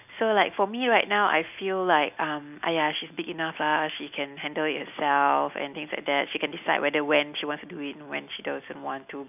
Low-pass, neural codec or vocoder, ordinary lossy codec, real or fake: 3.6 kHz; none; none; real